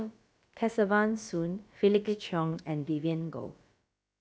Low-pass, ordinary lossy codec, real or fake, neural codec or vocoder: none; none; fake; codec, 16 kHz, about 1 kbps, DyCAST, with the encoder's durations